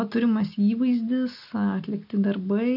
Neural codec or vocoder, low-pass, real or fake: none; 5.4 kHz; real